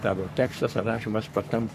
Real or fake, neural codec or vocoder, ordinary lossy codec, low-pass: fake; codec, 44.1 kHz, 3.4 kbps, Pupu-Codec; AAC, 96 kbps; 14.4 kHz